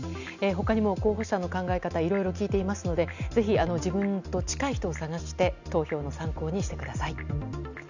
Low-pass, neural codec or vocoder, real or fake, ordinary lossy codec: 7.2 kHz; none; real; none